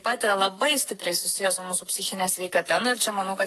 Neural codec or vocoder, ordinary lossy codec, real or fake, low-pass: codec, 44.1 kHz, 2.6 kbps, SNAC; AAC, 48 kbps; fake; 14.4 kHz